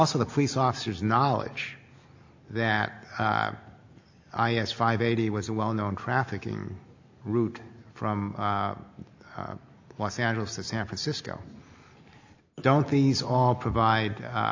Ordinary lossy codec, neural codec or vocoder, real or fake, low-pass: AAC, 48 kbps; none; real; 7.2 kHz